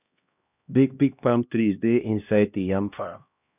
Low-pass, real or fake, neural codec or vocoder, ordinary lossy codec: 3.6 kHz; fake; codec, 16 kHz, 1 kbps, X-Codec, HuBERT features, trained on LibriSpeech; none